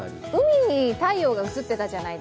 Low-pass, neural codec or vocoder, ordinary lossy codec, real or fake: none; none; none; real